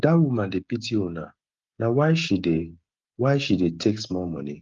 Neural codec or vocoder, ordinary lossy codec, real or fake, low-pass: codec, 16 kHz, 16 kbps, FreqCodec, smaller model; Opus, 32 kbps; fake; 7.2 kHz